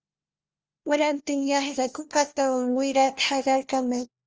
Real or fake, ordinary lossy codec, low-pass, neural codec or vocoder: fake; Opus, 24 kbps; 7.2 kHz; codec, 16 kHz, 1 kbps, FunCodec, trained on LibriTTS, 50 frames a second